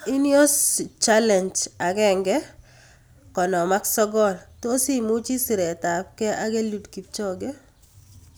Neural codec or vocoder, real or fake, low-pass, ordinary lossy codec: none; real; none; none